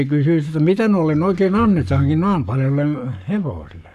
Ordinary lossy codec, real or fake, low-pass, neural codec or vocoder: none; fake; 14.4 kHz; codec, 44.1 kHz, 7.8 kbps, Pupu-Codec